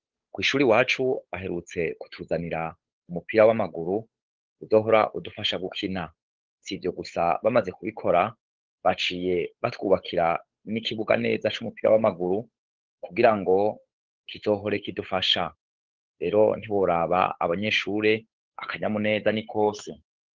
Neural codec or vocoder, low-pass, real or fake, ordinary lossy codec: codec, 16 kHz, 8 kbps, FunCodec, trained on Chinese and English, 25 frames a second; 7.2 kHz; fake; Opus, 16 kbps